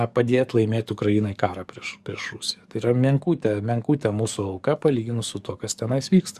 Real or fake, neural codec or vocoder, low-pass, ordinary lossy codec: fake; codec, 44.1 kHz, 7.8 kbps, DAC; 14.4 kHz; Opus, 64 kbps